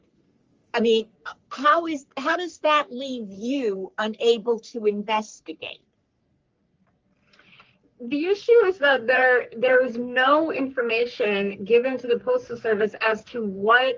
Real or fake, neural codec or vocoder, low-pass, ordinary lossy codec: fake; codec, 44.1 kHz, 3.4 kbps, Pupu-Codec; 7.2 kHz; Opus, 32 kbps